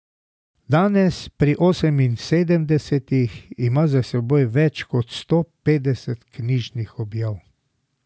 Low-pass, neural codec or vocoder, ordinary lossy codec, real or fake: none; none; none; real